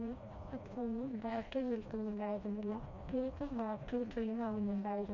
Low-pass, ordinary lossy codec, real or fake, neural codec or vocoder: 7.2 kHz; MP3, 48 kbps; fake; codec, 16 kHz, 1 kbps, FreqCodec, smaller model